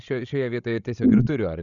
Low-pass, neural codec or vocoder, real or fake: 7.2 kHz; codec, 16 kHz, 16 kbps, FreqCodec, larger model; fake